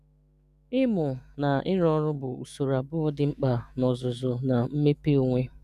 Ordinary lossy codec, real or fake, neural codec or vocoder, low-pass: none; fake; autoencoder, 48 kHz, 128 numbers a frame, DAC-VAE, trained on Japanese speech; 14.4 kHz